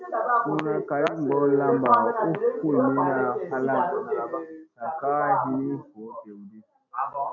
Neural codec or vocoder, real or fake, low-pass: none; real; 7.2 kHz